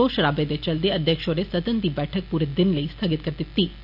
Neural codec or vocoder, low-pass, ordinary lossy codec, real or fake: none; 5.4 kHz; none; real